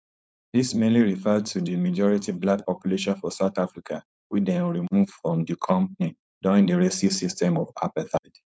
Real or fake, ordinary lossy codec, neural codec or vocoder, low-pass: fake; none; codec, 16 kHz, 4.8 kbps, FACodec; none